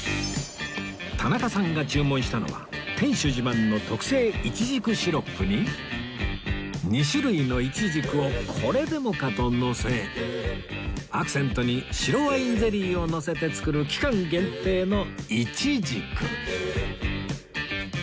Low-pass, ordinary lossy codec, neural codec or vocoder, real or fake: none; none; none; real